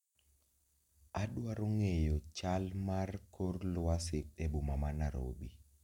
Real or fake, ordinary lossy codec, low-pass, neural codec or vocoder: real; none; 19.8 kHz; none